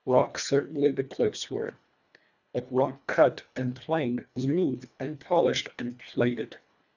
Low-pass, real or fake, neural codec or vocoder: 7.2 kHz; fake; codec, 24 kHz, 1.5 kbps, HILCodec